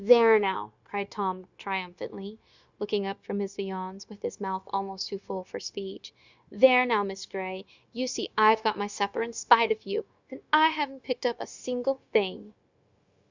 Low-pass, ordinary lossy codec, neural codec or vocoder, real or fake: 7.2 kHz; Opus, 64 kbps; codec, 16 kHz, 0.9 kbps, LongCat-Audio-Codec; fake